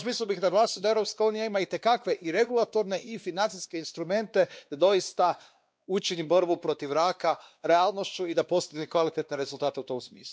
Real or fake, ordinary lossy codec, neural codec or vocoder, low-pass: fake; none; codec, 16 kHz, 2 kbps, X-Codec, WavLM features, trained on Multilingual LibriSpeech; none